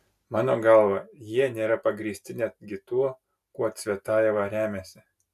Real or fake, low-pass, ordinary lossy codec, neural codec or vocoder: real; 14.4 kHz; AAC, 96 kbps; none